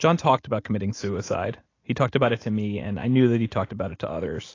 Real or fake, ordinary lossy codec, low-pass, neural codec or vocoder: real; AAC, 32 kbps; 7.2 kHz; none